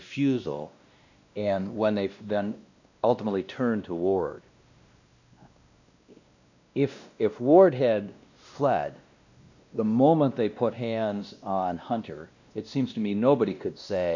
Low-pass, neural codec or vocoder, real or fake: 7.2 kHz; codec, 16 kHz, 1 kbps, X-Codec, WavLM features, trained on Multilingual LibriSpeech; fake